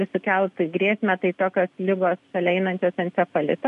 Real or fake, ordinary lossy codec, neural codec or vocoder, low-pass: real; MP3, 96 kbps; none; 10.8 kHz